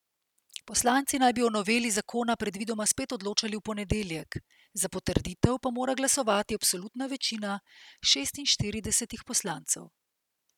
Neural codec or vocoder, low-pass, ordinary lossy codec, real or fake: none; 19.8 kHz; none; real